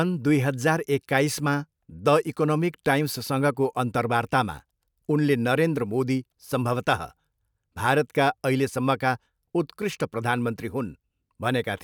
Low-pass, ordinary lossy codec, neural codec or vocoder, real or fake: none; none; none; real